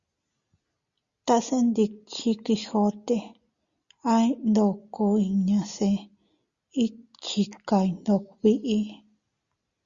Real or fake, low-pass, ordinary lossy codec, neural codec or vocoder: real; 7.2 kHz; Opus, 64 kbps; none